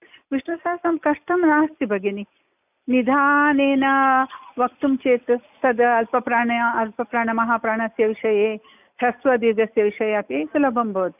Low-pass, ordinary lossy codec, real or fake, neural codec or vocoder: 3.6 kHz; none; real; none